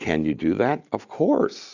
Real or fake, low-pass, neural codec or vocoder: real; 7.2 kHz; none